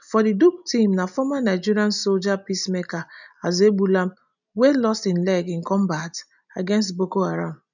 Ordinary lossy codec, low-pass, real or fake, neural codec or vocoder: none; 7.2 kHz; real; none